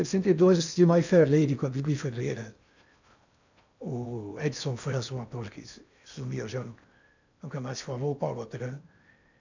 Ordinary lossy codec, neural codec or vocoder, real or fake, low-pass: none; codec, 16 kHz in and 24 kHz out, 0.8 kbps, FocalCodec, streaming, 65536 codes; fake; 7.2 kHz